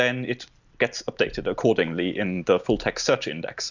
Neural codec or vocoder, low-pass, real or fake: none; 7.2 kHz; real